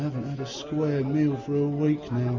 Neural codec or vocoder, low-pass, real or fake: none; 7.2 kHz; real